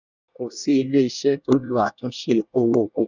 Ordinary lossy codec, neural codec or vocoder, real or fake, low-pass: none; codec, 24 kHz, 1 kbps, SNAC; fake; 7.2 kHz